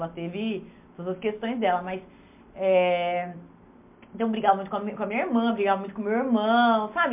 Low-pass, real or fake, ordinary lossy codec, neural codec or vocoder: 3.6 kHz; real; MP3, 32 kbps; none